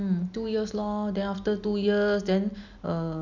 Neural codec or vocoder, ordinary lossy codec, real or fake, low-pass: none; none; real; 7.2 kHz